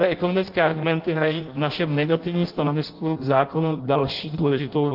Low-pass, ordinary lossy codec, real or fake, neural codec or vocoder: 5.4 kHz; Opus, 24 kbps; fake; codec, 16 kHz in and 24 kHz out, 0.6 kbps, FireRedTTS-2 codec